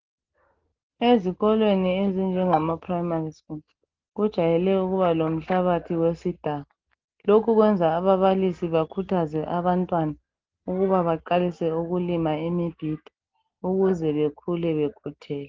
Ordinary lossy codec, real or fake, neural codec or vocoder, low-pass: Opus, 16 kbps; real; none; 7.2 kHz